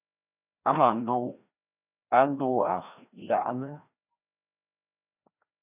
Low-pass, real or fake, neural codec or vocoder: 3.6 kHz; fake; codec, 16 kHz, 1 kbps, FreqCodec, larger model